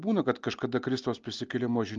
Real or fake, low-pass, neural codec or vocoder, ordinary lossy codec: real; 7.2 kHz; none; Opus, 32 kbps